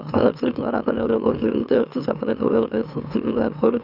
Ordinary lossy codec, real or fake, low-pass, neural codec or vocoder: none; fake; 5.4 kHz; autoencoder, 44.1 kHz, a latent of 192 numbers a frame, MeloTTS